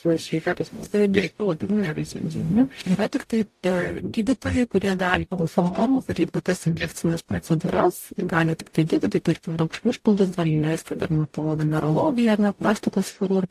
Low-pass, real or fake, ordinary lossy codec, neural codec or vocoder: 14.4 kHz; fake; AAC, 64 kbps; codec, 44.1 kHz, 0.9 kbps, DAC